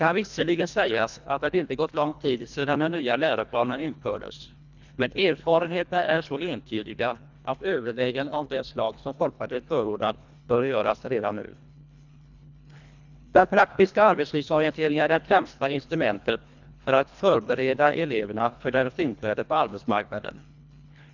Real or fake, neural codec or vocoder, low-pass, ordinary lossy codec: fake; codec, 24 kHz, 1.5 kbps, HILCodec; 7.2 kHz; none